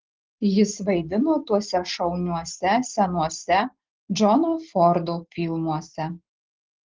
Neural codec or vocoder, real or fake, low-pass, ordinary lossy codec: none; real; 7.2 kHz; Opus, 16 kbps